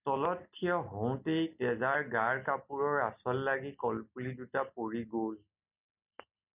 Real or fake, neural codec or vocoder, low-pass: real; none; 3.6 kHz